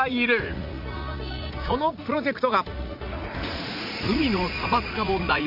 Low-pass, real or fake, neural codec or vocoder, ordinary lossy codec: 5.4 kHz; fake; vocoder, 44.1 kHz, 80 mel bands, Vocos; none